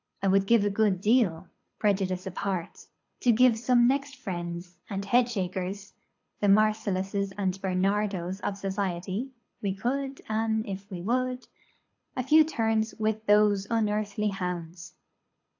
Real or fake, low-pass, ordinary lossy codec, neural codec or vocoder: fake; 7.2 kHz; AAC, 48 kbps; codec, 24 kHz, 6 kbps, HILCodec